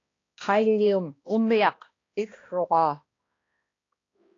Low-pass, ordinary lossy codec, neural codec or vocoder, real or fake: 7.2 kHz; AAC, 32 kbps; codec, 16 kHz, 1 kbps, X-Codec, HuBERT features, trained on balanced general audio; fake